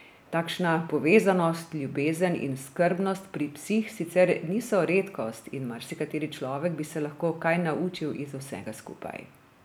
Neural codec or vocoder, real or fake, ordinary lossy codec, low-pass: none; real; none; none